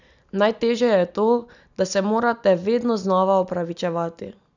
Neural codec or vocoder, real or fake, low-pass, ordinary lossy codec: none; real; 7.2 kHz; none